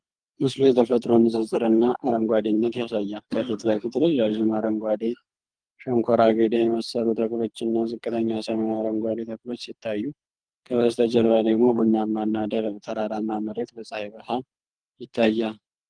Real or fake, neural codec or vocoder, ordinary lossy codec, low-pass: fake; codec, 24 kHz, 3 kbps, HILCodec; Opus, 32 kbps; 9.9 kHz